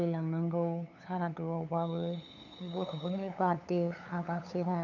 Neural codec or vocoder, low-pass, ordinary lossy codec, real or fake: codec, 16 kHz, 8 kbps, FunCodec, trained on LibriTTS, 25 frames a second; 7.2 kHz; none; fake